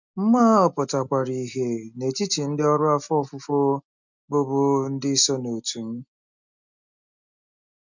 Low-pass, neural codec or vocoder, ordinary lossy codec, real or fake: 7.2 kHz; none; none; real